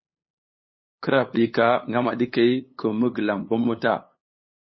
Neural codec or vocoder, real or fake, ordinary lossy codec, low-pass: codec, 16 kHz, 8 kbps, FunCodec, trained on LibriTTS, 25 frames a second; fake; MP3, 24 kbps; 7.2 kHz